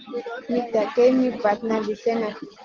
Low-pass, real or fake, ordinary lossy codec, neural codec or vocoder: 7.2 kHz; real; Opus, 16 kbps; none